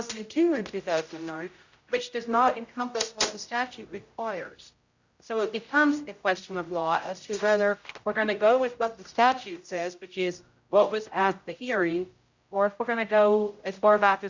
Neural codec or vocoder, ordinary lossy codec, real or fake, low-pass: codec, 16 kHz, 0.5 kbps, X-Codec, HuBERT features, trained on general audio; Opus, 64 kbps; fake; 7.2 kHz